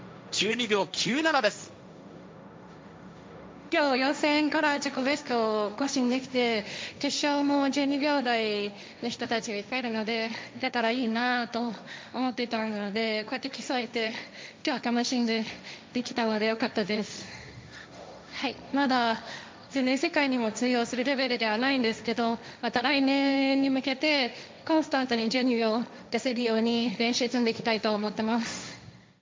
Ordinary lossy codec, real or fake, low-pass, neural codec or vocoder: none; fake; none; codec, 16 kHz, 1.1 kbps, Voila-Tokenizer